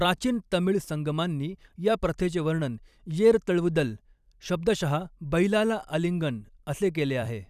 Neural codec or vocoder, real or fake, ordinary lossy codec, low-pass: vocoder, 44.1 kHz, 128 mel bands every 256 samples, BigVGAN v2; fake; none; 14.4 kHz